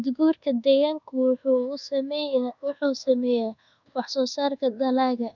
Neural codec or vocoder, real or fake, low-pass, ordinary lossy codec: codec, 24 kHz, 1.2 kbps, DualCodec; fake; 7.2 kHz; none